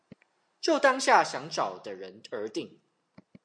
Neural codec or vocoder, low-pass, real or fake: none; 9.9 kHz; real